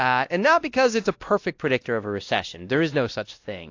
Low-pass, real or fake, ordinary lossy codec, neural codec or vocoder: 7.2 kHz; fake; AAC, 48 kbps; codec, 16 kHz, 1 kbps, X-Codec, WavLM features, trained on Multilingual LibriSpeech